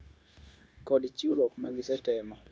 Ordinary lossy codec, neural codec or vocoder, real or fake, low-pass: none; codec, 16 kHz, 0.9 kbps, LongCat-Audio-Codec; fake; none